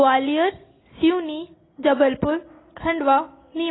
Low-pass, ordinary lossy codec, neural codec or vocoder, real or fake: 7.2 kHz; AAC, 16 kbps; none; real